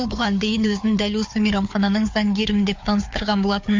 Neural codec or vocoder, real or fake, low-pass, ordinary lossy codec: codec, 16 kHz, 4 kbps, FunCodec, trained on Chinese and English, 50 frames a second; fake; 7.2 kHz; MP3, 48 kbps